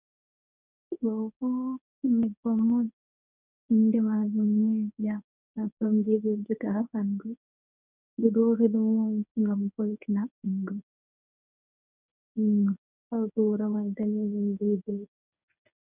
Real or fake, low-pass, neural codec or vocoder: fake; 3.6 kHz; codec, 24 kHz, 0.9 kbps, WavTokenizer, medium speech release version 1